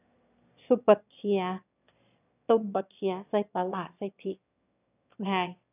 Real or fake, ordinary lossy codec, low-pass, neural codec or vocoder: fake; none; 3.6 kHz; autoencoder, 22.05 kHz, a latent of 192 numbers a frame, VITS, trained on one speaker